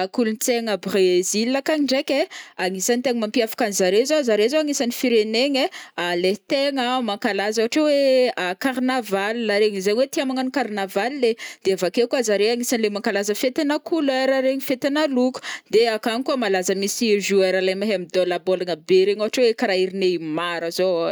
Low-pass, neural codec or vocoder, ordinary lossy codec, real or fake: none; none; none; real